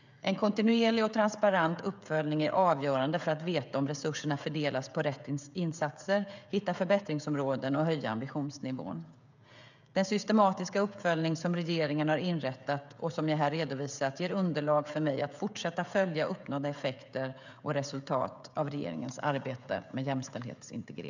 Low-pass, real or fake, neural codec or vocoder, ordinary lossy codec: 7.2 kHz; fake; codec, 16 kHz, 16 kbps, FreqCodec, smaller model; none